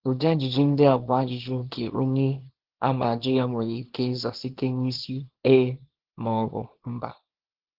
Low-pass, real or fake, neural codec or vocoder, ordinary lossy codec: 5.4 kHz; fake; codec, 24 kHz, 0.9 kbps, WavTokenizer, small release; Opus, 16 kbps